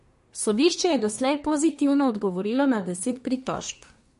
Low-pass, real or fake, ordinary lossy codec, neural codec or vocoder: 10.8 kHz; fake; MP3, 48 kbps; codec, 24 kHz, 1 kbps, SNAC